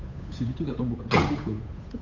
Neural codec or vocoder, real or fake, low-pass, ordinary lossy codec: codec, 16 kHz, 8 kbps, FunCodec, trained on Chinese and English, 25 frames a second; fake; 7.2 kHz; none